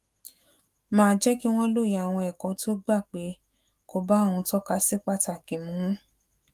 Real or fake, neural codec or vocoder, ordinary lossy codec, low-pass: fake; autoencoder, 48 kHz, 128 numbers a frame, DAC-VAE, trained on Japanese speech; Opus, 32 kbps; 14.4 kHz